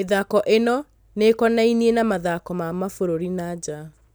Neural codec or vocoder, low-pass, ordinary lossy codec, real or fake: none; none; none; real